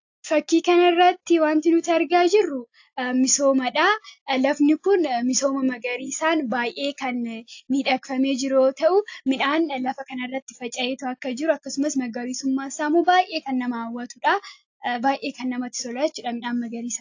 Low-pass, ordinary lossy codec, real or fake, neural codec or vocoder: 7.2 kHz; AAC, 48 kbps; real; none